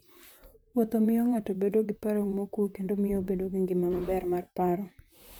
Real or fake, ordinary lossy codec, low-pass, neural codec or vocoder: fake; none; none; vocoder, 44.1 kHz, 128 mel bands, Pupu-Vocoder